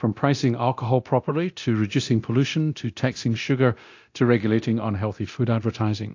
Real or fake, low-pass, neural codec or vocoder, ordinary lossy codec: fake; 7.2 kHz; codec, 24 kHz, 0.9 kbps, DualCodec; AAC, 48 kbps